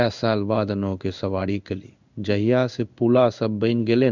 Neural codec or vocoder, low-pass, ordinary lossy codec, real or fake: codec, 16 kHz in and 24 kHz out, 1 kbps, XY-Tokenizer; 7.2 kHz; none; fake